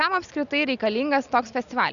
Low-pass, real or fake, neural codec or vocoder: 7.2 kHz; real; none